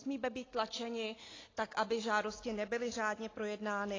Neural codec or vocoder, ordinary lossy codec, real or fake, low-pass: none; AAC, 32 kbps; real; 7.2 kHz